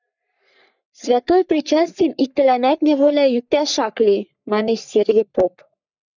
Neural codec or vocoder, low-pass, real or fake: codec, 44.1 kHz, 3.4 kbps, Pupu-Codec; 7.2 kHz; fake